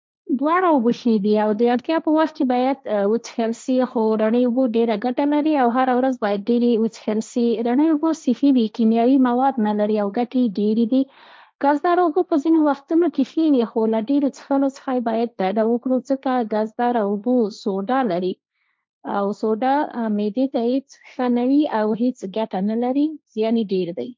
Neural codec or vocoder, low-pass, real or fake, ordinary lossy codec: codec, 16 kHz, 1.1 kbps, Voila-Tokenizer; none; fake; none